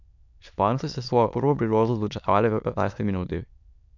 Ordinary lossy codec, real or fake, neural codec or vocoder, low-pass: none; fake; autoencoder, 22.05 kHz, a latent of 192 numbers a frame, VITS, trained on many speakers; 7.2 kHz